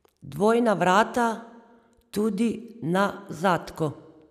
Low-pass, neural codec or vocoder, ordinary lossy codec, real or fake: 14.4 kHz; none; none; real